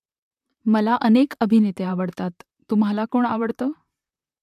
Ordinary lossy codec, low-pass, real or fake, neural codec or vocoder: MP3, 96 kbps; 14.4 kHz; fake; vocoder, 44.1 kHz, 128 mel bands, Pupu-Vocoder